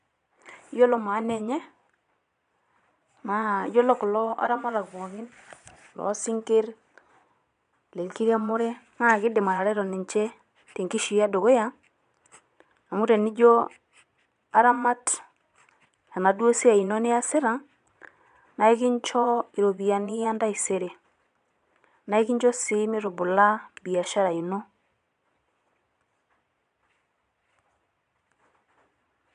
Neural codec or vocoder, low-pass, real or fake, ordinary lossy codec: vocoder, 22.05 kHz, 80 mel bands, Vocos; 9.9 kHz; fake; none